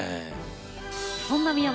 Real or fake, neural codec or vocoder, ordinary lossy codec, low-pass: real; none; none; none